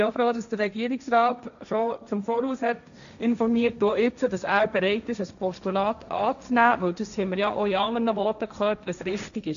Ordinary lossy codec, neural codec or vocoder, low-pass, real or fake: none; codec, 16 kHz, 1.1 kbps, Voila-Tokenizer; 7.2 kHz; fake